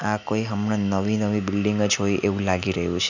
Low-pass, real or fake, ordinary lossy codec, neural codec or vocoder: 7.2 kHz; real; none; none